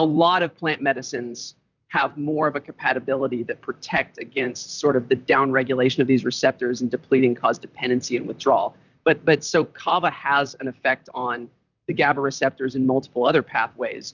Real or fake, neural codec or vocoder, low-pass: fake; vocoder, 44.1 kHz, 128 mel bands, Pupu-Vocoder; 7.2 kHz